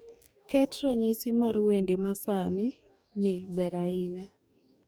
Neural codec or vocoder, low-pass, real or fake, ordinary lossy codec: codec, 44.1 kHz, 2.6 kbps, DAC; none; fake; none